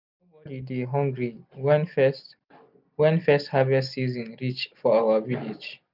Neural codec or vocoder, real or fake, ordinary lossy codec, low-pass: none; real; none; 5.4 kHz